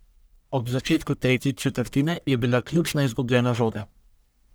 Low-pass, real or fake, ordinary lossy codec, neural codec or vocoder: none; fake; none; codec, 44.1 kHz, 1.7 kbps, Pupu-Codec